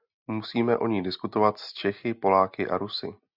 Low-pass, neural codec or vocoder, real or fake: 5.4 kHz; none; real